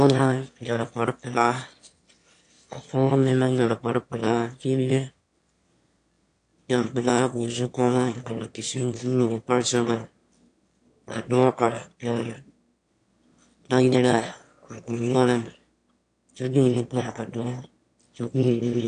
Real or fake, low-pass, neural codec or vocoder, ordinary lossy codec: fake; 9.9 kHz; autoencoder, 22.05 kHz, a latent of 192 numbers a frame, VITS, trained on one speaker; AAC, 64 kbps